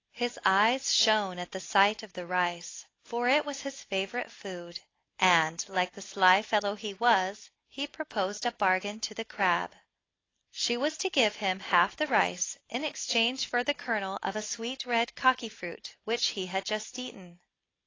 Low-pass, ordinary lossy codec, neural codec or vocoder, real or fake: 7.2 kHz; AAC, 32 kbps; none; real